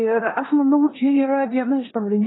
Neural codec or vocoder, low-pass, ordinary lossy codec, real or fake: codec, 16 kHz in and 24 kHz out, 0.9 kbps, LongCat-Audio-Codec, four codebook decoder; 7.2 kHz; AAC, 16 kbps; fake